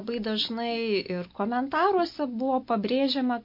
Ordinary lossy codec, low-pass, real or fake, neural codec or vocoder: MP3, 32 kbps; 5.4 kHz; real; none